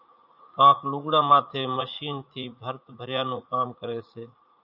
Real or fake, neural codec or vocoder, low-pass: fake; vocoder, 44.1 kHz, 80 mel bands, Vocos; 5.4 kHz